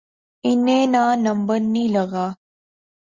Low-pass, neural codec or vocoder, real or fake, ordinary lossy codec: 7.2 kHz; none; real; Opus, 64 kbps